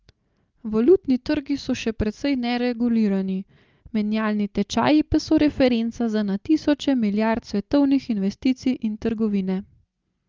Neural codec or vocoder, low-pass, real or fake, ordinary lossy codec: none; 7.2 kHz; real; Opus, 32 kbps